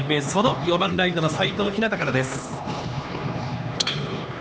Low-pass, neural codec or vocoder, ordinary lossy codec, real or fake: none; codec, 16 kHz, 2 kbps, X-Codec, HuBERT features, trained on LibriSpeech; none; fake